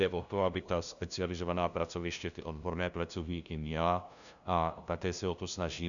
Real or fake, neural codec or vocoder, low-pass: fake; codec, 16 kHz, 0.5 kbps, FunCodec, trained on LibriTTS, 25 frames a second; 7.2 kHz